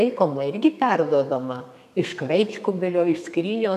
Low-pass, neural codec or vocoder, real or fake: 14.4 kHz; codec, 32 kHz, 1.9 kbps, SNAC; fake